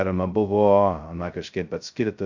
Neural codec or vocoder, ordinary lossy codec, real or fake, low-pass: codec, 16 kHz, 0.2 kbps, FocalCodec; Opus, 64 kbps; fake; 7.2 kHz